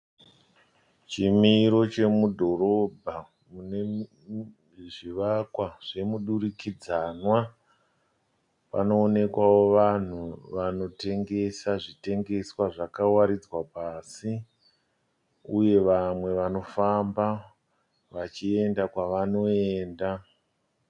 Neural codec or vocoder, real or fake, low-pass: none; real; 10.8 kHz